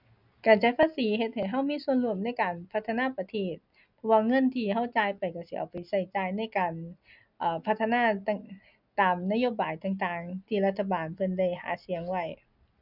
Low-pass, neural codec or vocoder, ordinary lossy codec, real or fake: 5.4 kHz; none; none; real